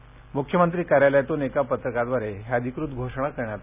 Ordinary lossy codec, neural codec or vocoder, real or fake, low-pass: none; none; real; 3.6 kHz